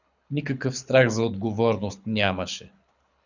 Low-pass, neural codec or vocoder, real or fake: 7.2 kHz; codec, 24 kHz, 6 kbps, HILCodec; fake